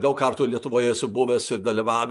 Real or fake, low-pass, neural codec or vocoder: fake; 10.8 kHz; vocoder, 24 kHz, 100 mel bands, Vocos